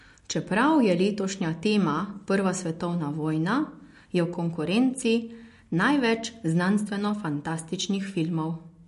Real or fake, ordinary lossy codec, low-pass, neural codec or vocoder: real; MP3, 48 kbps; 14.4 kHz; none